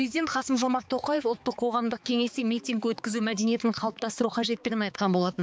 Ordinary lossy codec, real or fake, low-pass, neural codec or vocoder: none; fake; none; codec, 16 kHz, 4 kbps, X-Codec, HuBERT features, trained on balanced general audio